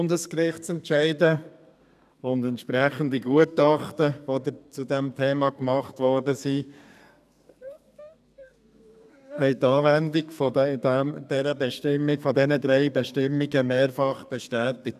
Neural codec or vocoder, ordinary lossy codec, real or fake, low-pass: codec, 32 kHz, 1.9 kbps, SNAC; none; fake; 14.4 kHz